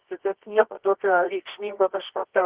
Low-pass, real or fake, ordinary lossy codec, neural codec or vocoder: 3.6 kHz; fake; Opus, 16 kbps; codec, 24 kHz, 0.9 kbps, WavTokenizer, medium music audio release